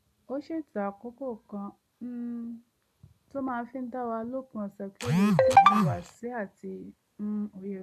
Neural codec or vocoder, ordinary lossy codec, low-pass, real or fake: none; none; 14.4 kHz; real